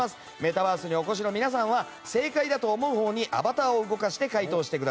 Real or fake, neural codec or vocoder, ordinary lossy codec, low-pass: real; none; none; none